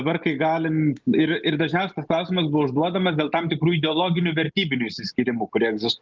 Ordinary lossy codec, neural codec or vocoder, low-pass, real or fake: Opus, 32 kbps; none; 7.2 kHz; real